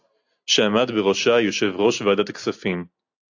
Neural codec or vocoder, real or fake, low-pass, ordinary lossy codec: none; real; 7.2 kHz; AAC, 48 kbps